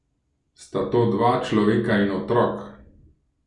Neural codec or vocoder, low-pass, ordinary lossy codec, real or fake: none; 10.8 kHz; none; real